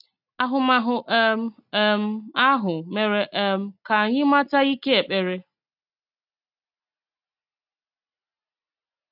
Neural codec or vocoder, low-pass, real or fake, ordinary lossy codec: none; 5.4 kHz; real; none